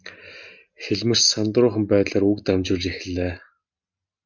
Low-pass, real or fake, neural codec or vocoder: 7.2 kHz; real; none